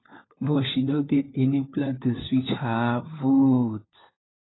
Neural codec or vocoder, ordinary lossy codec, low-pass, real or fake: codec, 16 kHz, 4 kbps, FunCodec, trained on LibriTTS, 50 frames a second; AAC, 16 kbps; 7.2 kHz; fake